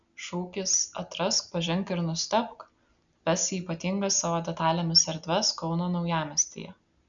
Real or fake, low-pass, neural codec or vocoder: real; 7.2 kHz; none